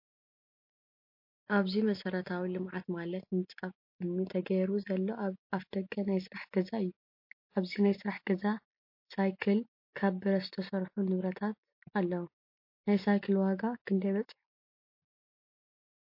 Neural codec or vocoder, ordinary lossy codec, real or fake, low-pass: none; MP3, 32 kbps; real; 5.4 kHz